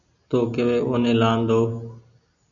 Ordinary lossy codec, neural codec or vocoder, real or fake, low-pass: MP3, 96 kbps; none; real; 7.2 kHz